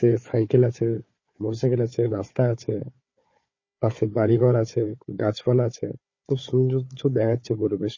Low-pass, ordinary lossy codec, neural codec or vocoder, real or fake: 7.2 kHz; MP3, 32 kbps; codec, 16 kHz, 4 kbps, FunCodec, trained on Chinese and English, 50 frames a second; fake